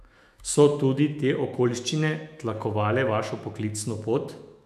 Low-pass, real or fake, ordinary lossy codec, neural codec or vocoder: 14.4 kHz; fake; none; autoencoder, 48 kHz, 128 numbers a frame, DAC-VAE, trained on Japanese speech